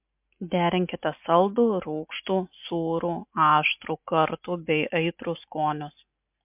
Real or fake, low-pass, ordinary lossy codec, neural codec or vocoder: real; 3.6 kHz; MP3, 32 kbps; none